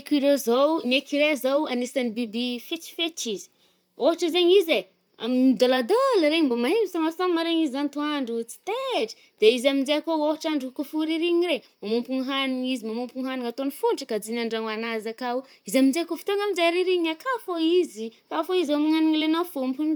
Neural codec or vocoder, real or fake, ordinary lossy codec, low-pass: vocoder, 44.1 kHz, 128 mel bands, Pupu-Vocoder; fake; none; none